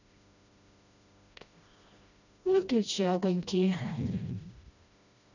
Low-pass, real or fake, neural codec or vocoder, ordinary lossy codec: 7.2 kHz; fake; codec, 16 kHz, 1 kbps, FreqCodec, smaller model; none